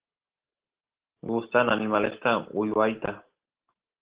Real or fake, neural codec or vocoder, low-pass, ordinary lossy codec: real; none; 3.6 kHz; Opus, 16 kbps